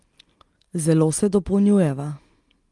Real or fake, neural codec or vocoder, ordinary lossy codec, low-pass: real; none; Opus, 24 kbps; 10.8 kHz